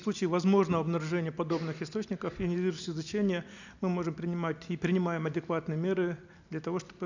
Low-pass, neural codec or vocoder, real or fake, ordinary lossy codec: 7.2 kHz; none; real; none